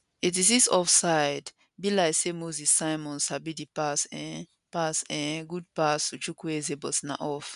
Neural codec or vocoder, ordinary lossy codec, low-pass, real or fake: none; none; 10.8 kHz; real